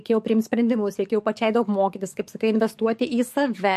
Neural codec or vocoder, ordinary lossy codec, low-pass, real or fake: codec, 44.1 kHz, 7.8 kbps, DAC; MP3, 64 kbps; 14.4 kHz; fake